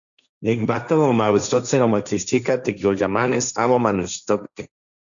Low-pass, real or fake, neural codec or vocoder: 7.2 kHz; fake; codec, 16 kHz, 1.1 kbps, Voila-Tokenizer